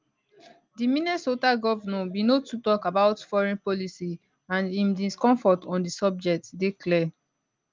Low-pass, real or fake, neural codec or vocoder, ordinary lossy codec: 7.2 kHz; real; none; Opus, 24 kbps